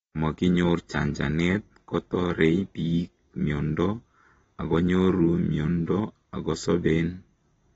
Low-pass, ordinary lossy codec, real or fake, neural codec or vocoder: 19.8 kHz; AAC, 24 kbps; real; none